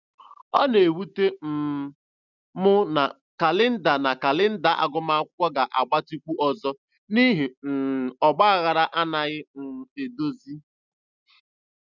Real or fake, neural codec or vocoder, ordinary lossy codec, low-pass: real; none; none; 7.2 kHz